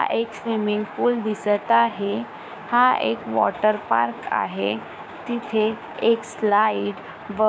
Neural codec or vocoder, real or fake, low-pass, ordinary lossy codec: codec, 16 kHz, 6 kbps, DAC; fake; none; none